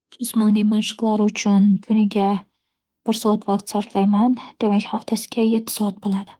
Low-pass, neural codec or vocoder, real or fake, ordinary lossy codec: 19.8 kHz; autoencoder, 48 kHz, 32 numbers a frame, DAC-VAE, trained on Japanese speech; fake; Opus, 24 kbps